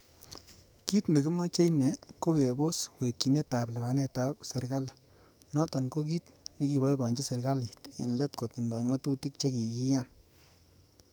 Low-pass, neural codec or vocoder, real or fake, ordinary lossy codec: none; codec, 44.1 kHz, 2.6 kbps, SNAC; fake; none